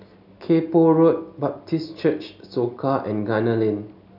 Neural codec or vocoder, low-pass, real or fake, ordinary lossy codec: none; 5.4 kHz; real; none